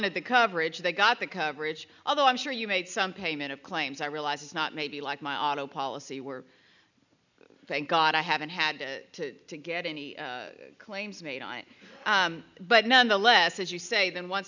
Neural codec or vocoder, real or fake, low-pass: none; real; 7.2 kHz